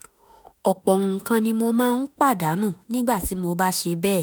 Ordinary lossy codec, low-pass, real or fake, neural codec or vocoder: none; none; fake; autoencoder, 48 kHz, 32 numbers a frame, DAC-VAE, trained on Japanese speech